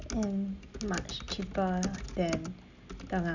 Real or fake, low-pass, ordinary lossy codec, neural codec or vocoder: real; 7.2 kHz; none; none